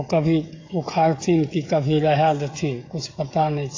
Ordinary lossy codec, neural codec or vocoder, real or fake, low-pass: AAC, 32 kbps; codec, 16 kHz, 16 kbps, FreqCodec, smaller model; fake; 7.2 kHz